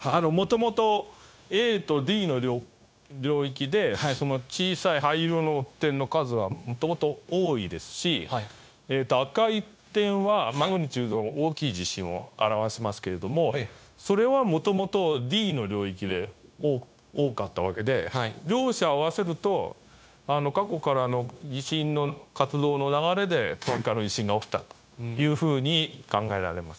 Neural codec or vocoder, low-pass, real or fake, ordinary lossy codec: codec, 16 kHz, 0.9 kbps, LongCat-Audio-Codec; none; fake; none